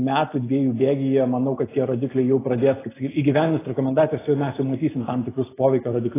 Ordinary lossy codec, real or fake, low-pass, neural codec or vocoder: AAC, 16 kbps; real; 3.6 kHz; none